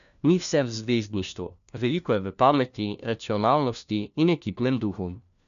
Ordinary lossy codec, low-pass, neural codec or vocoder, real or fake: none; 7.2 kHz; codec, 16 kHz, 1 kbps, FunCodec, trained on LibriTTS, 50 frames a second; fake